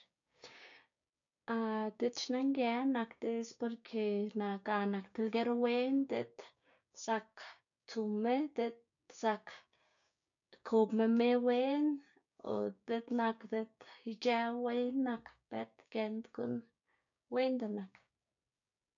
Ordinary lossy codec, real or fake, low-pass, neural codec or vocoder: MP3, 64 kbps; fake; 7.2 kHz; codec, 16 kHz, 6 kbps, DAC